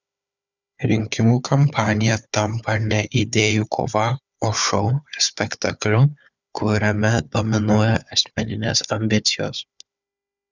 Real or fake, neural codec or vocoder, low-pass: fake; codec, 16 kHz, 4 kbps, FunCodec, trained on Chinese and English, 50 frames a second; 7.2 kHz